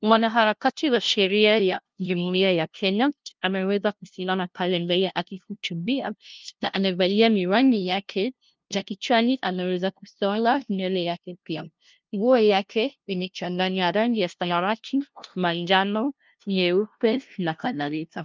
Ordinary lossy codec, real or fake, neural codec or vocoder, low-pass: Opus, 24 kbps; fake; codec, 16 kHz, 0.5 kbps, FunCodec, trained on LibriTTS, 25 frames a second; 7.2 kHz